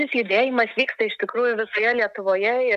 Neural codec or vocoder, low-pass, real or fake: none; 14.4 kHz; real